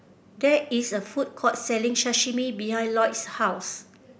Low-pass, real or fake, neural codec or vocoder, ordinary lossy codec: none; real; none; none